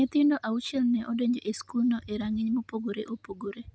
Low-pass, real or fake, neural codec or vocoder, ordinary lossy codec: none; real; none; none